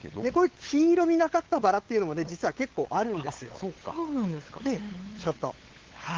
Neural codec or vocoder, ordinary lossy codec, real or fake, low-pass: codec, 16 kHz, 8 kbps, FunCodec, trained on LibriTTS, 25 frames a second; Opus, 16 kbps; fake; 7.2 kHz